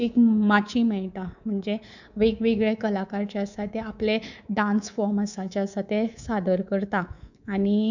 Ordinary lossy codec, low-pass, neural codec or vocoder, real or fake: none; 7.2 kHz; codec, 24 kHz, 3.1 kbps, DualCodec; fake